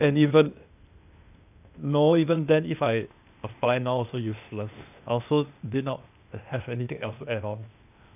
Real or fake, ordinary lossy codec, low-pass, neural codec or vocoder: fake; none; 3.6 kHz; codec, 16 kHz, 0.8 kbps, ZipCodec